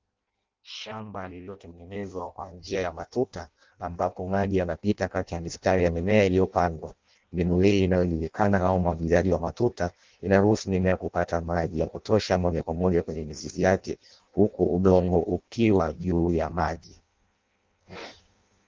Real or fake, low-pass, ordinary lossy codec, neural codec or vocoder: fake; 7.2 kHz; Opus, 24 kbps; codec, 16 kHz in and 24 kHz out, 0.6 kbps, FireRedTTS-2 codec